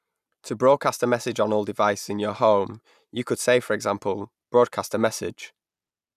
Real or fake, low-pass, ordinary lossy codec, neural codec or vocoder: real; 14.4 kHz; none; none